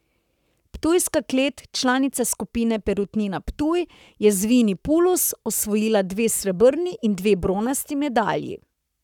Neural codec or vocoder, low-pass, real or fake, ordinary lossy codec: codec, 44.1 kHz, 7.8 kbps, Pupu-Codec; 19.8 kHz; fake; none